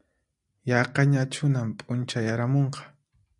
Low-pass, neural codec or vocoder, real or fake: 10.8 kHz; none; real